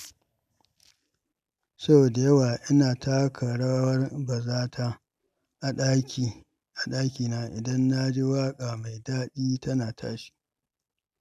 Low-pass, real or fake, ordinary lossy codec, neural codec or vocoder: 14.4 kHz; real; none; none